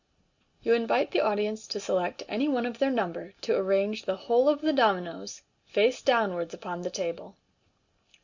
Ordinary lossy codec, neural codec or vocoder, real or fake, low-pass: Opus, 64 kbps; none; real; 7.2 kHz